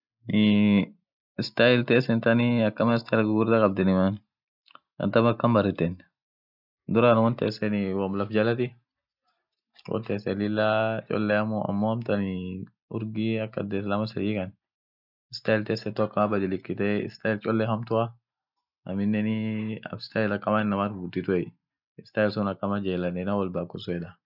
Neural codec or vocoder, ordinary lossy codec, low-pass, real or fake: none; none; 5.4 kHz; real